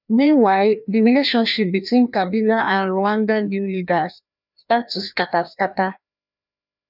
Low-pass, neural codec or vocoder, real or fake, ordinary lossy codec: 5.4 kHz; codec, 16 kHz, 1 kbps, FreqCodec, larger model; fake; none